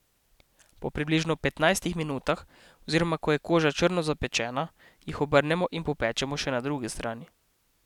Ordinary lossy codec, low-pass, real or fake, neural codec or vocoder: none; 19.8 kHz; real; none